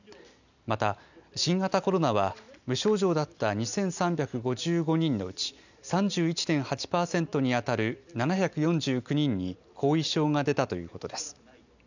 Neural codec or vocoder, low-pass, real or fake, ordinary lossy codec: none; 7.2 kHz; real; none